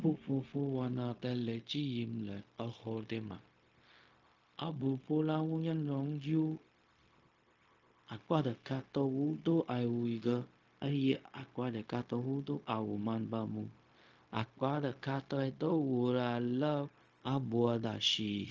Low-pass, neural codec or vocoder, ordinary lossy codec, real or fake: 7.2 kHz; codec, 16 kHz, 0.4 kbps, LongCat-Audio-Codec; Opus, 24 kbps; fake